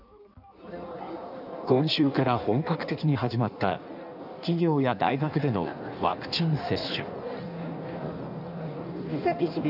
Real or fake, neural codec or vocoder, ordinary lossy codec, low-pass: fake; codec, 16 kHz in and 24 kHz out, 1.1 kbps, FireRedTTS-2 codec; none; 5.4 kHz